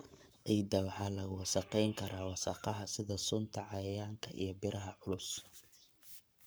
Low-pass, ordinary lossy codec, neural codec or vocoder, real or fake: none; none; codec, 44.1 kHz, 7.8 kbps, Pupu-Codec; fake